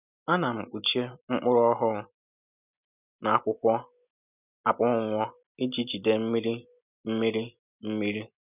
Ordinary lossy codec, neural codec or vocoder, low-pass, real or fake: none; none; 3.6 kHz; real